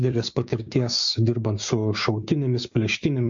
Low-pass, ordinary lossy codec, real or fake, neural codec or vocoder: 7.2 kHz; MP3, 48 kbps; fake; codec, 16 kHz, 6 kbps, DAC